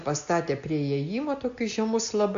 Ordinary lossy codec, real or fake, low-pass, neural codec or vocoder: MP3, 64 kbps; real; 7.2 kHz; none